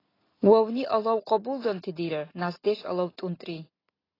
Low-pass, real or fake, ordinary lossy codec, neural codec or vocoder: 5.4 kHz; real; AAC, 24 kbps; none